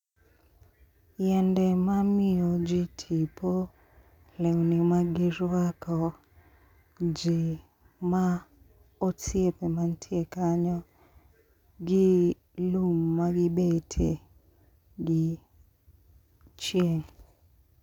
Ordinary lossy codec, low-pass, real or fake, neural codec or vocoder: none; 19.8 kHz; real; none